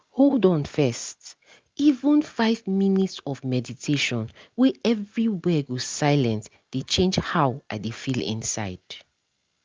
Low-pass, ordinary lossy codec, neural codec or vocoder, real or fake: 7.2 kHz; Opus, 24 kbps; none; real